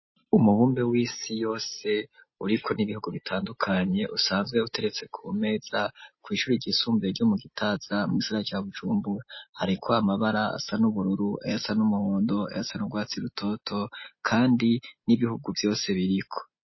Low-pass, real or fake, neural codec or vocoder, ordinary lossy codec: 7.2 kHz; real; none; MP3, 24 kbps